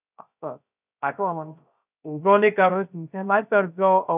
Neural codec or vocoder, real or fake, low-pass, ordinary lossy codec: codec, 16 kHz, 0.3 kbps, FocalCodec; fake; 3.6 kHz; none